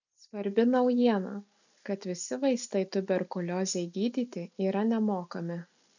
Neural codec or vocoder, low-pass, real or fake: none; 7.2 kHz; real